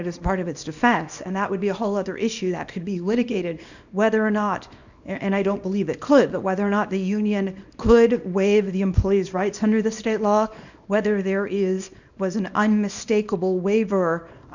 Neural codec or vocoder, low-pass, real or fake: codec, 24 kHz, 0.9 kbps, WavTokenizer, small release; 7.2 kHz; fake